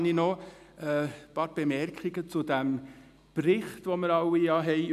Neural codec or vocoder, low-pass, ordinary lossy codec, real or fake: none; 14.4 kHz; none; real